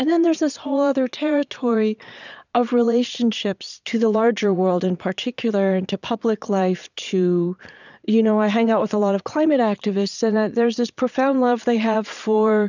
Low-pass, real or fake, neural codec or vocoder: 7.2 kHz; fake; vocoder, 22.05 kHz, 80 mel bands, Vocos